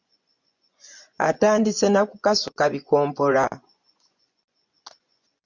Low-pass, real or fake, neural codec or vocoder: 7.2 kHz; fake; vocoder, 24 kHz, 100 mel bands, Vocos